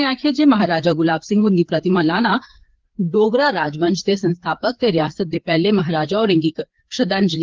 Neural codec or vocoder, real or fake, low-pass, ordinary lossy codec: codec, 16 kHz, 4 kbps, FreqCodec, larger model; fake; 7.2 kHz; Opus, 16 kbps